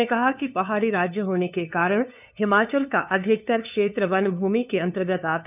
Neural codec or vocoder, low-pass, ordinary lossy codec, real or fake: codec, 16 kHz, 2 kbps, FunCodec, trained on LibriTTS, 25 frames a second; 3.6 kHz; none; fake